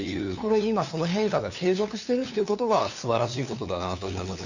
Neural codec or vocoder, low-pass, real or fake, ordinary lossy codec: codec, 16 kHz, 2 kbps, FunCodec, trained on LibriTTS, 25 frames a second; 7.2 kHz; fake; none